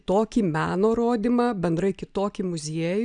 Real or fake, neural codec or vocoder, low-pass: real; none; 9.9 kHz